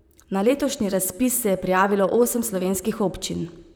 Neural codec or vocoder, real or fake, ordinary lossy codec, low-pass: vocoder, 44.1 kHz, 128 mel bands, Pupu-Vocoder; fake; none; none